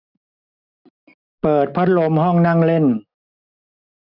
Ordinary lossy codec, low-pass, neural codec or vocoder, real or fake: none; 5.4 kHz; none; real